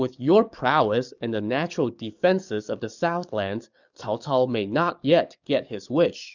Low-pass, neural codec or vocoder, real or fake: 7.2 kHz; codec, 44.1 kHz, 7.8 kbps, DAC; fake